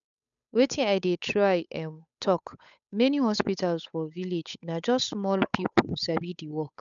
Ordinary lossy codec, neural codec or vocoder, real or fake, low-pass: none; codec, 16 kHz, 8 kbps, FunCodec, trained on Chinese and English, 25 frames a second; fake; 7.2 kHz